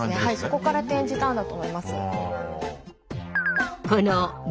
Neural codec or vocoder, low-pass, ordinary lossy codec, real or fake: none; none; none; real